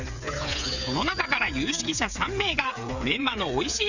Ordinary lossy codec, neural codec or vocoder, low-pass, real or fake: none; codec, 16 kHz, 8 kbps, FreqCodec, smaller model; 7.2 kHz; fake